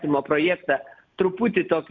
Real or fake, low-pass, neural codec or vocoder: real; 7.2 kHz; none